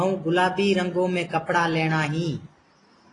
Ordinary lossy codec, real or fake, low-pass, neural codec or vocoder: AAC, 32 kbps; real; 10.8 kHz; none